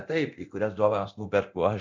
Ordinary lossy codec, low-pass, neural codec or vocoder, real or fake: MP3, 64 kbps; 7.2 kHz; codec, 24 kHz, 0.9 kbps, DualCodec; fake